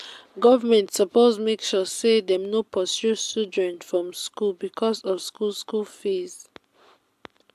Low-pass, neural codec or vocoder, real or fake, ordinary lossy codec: 14.4 kHz; none; real; AAC, 96 kbps